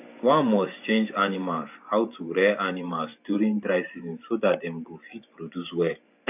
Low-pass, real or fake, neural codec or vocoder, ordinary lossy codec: 3.6 kHz; fake; vocoder, 44.1 kHz, 128 mel bands every 512 samples, BigVGAN v2; none